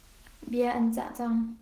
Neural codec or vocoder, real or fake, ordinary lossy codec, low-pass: autoencoder, 48 kHz, 128 numbers a frame, DAC-VAE, trained on Japanese speech; fake; Opus, 16 kbps; 14.4 kHz